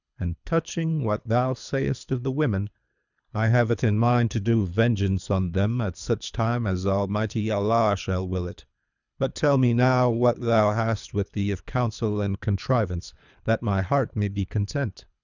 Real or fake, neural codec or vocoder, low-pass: fake; codec, 24 kHz, 3 kbps, HILCodec; 7.2 kHz